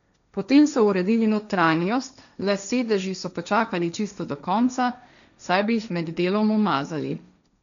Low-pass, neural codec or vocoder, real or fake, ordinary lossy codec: 7.2 kHz; codec, 16 kHz, 1.1 kbps, Voila-Tokenizer; fake; none